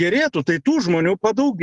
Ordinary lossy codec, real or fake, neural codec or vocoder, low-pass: Opus, 32 kbps; real; none; 10.8 kHz